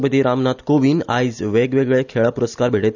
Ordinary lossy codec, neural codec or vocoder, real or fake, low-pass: none; none; real; 7.2 kHz